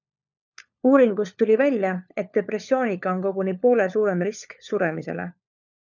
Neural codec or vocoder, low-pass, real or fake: codec, 16 kHz, 4 kbps, FunCodec, trained on LibriTTS, 50 frames a second; 7.2 kHz; fake